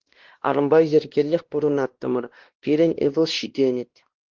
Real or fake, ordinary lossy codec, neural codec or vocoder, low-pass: fake; Opus, 16 kbps; codec, 16 kHz, 1 kbps, X-Codec, WavLM features, trained on Multilingual LibriSpeech; 7.2 kHz